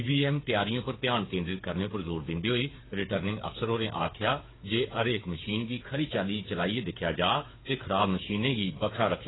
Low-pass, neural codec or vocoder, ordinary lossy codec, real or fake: 7.2 kHz; codec, 16 kHz, 8 kbps, FreqCodec, smaller model; AAC, 16 kbps; fake